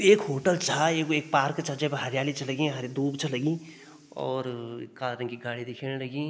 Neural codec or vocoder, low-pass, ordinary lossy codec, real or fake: none; none; none; real